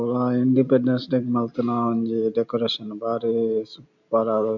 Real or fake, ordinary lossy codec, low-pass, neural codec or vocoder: real; none; 7.2 kHz; none